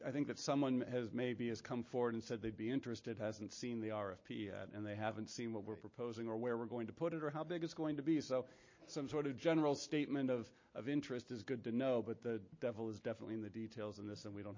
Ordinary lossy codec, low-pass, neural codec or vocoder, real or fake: MP3, 32 kbps; 7.2 kHz; none; real